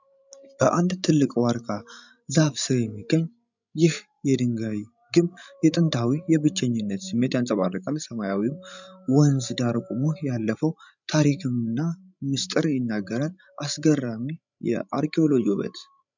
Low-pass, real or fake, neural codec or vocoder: 7.2 kHz; fake; codec, 16 kHz, 16 kbps, FreqCodec, larger model